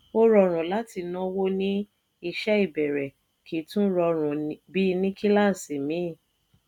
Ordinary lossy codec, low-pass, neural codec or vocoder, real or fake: none; 19.8 kHz; none; real